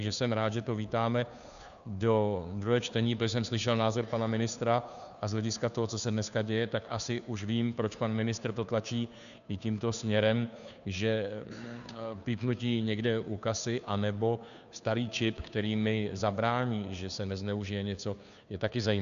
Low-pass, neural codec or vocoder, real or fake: 7.2 kHz; codec, 16 kHz, 2 kbps, FunCodec, trained on Chinese and English, 25 frames a second; fake